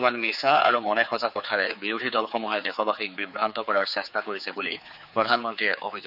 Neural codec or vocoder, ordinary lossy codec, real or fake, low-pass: codec, 16 kHz, 4 kbps, X-Codec, HuBERT features, trained on general audio; none; fake; 5.4 kHz